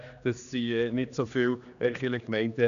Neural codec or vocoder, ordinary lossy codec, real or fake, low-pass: codec, 16 kHz, 2 kbps, X-Codec, HuBERT features, trained on general audio; none; fake; 7.2 kHz